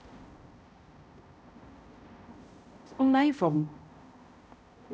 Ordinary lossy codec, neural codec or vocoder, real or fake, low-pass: none; codec, 16 kHz, 0.5 kbps, X-Codec, HuBERT features, trained on balanced general audio; fake; none